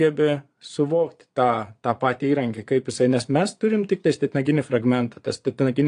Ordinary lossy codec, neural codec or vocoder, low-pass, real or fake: AAC, 48 kbps; vocoder, 22.05 kHz, 80 mel bands, Vocos; 9.9 kHz; fake